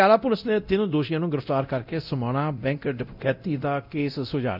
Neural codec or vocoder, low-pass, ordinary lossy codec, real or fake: codec, 24 kHz, 0.9 kbps, DualCodec; 5.4 kHz; none; fake